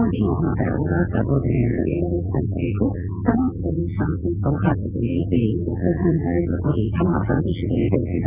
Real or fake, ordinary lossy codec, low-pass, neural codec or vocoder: fake; none; 3.6 kHz; vocoder, 22.05 kHz, 80 mel bands, WaveNeXt